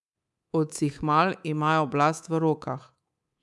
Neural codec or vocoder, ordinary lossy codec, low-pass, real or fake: codec, 24 kHz, 3.1 kbps, DualCodec; none; none; fake